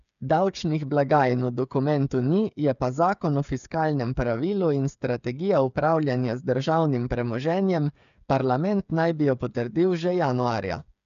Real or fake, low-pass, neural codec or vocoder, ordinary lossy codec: fake; 7.2 kHz; codec, 16 kHz, 8 kbps, FreqCodec, smaller model; none